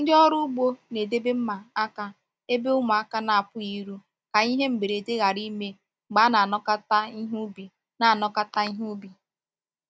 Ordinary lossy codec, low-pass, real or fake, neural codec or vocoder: none; none; real; none